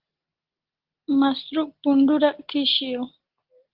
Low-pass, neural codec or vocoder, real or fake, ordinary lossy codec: 5.4 kHz; none; real; Opus, 16 kbps